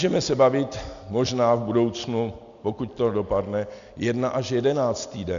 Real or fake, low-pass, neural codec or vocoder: real; 7.2 kHz; none